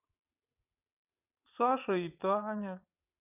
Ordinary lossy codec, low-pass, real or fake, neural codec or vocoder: none; 3.6 kHz; fake; vocoder, 22.05 kHz, 80 mel bands, WaveNeXt